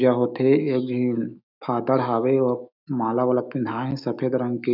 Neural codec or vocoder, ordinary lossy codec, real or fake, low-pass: autoencoder, 48 kHz, 128 numbers a frame, DAC-VAE, trained on Japanese speech; none; fake; 5.4 kHz